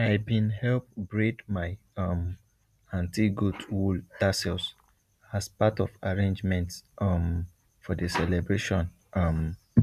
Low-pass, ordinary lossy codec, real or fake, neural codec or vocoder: 14.4 kHz; none; real; none